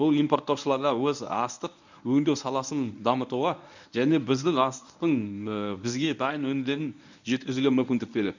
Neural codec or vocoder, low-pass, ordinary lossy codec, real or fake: codec, 24 kHz, 0.9 kbps, WavTokenizer, medium speech release version 1; 7.2 kHz; none; fake